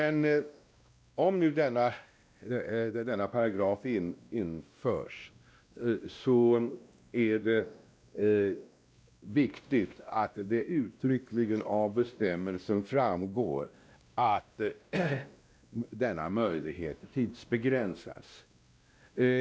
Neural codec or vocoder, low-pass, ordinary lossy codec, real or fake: codec, 16 kHz, 1 kbps, X-Codec, WavLM features, trained on Multilingual LibriSpeech; none; none; fake